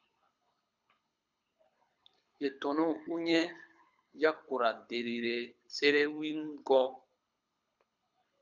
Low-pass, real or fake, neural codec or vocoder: 7.2 kHz; fake; codec, 24 kHz, 6 kbps, HILCodec